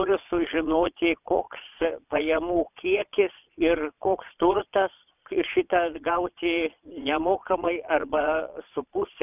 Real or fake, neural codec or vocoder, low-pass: fake; vocoder, 22.05 kHz, 80 mel bands, Vocos; 3.6 kHz